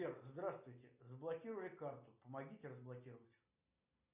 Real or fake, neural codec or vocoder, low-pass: real; none; 3.6 kHz